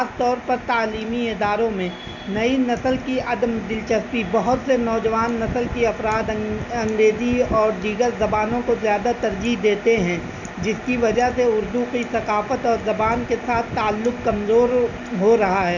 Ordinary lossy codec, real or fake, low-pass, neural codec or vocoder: none; real; 7.2 kHz; none